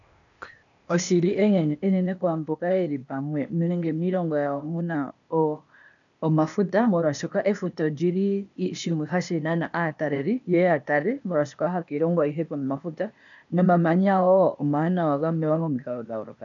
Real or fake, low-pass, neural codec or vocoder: fake; 7.2 kHz; codec, 16 kHz, 0.8 kbps, ZipCodec